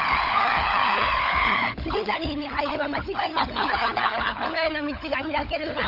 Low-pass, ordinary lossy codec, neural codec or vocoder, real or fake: 5.4 kHz; AAC, 48 kbps; codec, 16 kHz, 16 kbps, FunCodec, trained on LibriTTS, 50 frames a second; fake